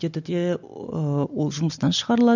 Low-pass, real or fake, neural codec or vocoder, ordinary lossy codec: 7.2 kHz; real; none; none